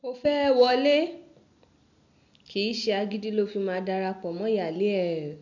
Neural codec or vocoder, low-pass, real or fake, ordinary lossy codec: none; 7.2 kHz; real; none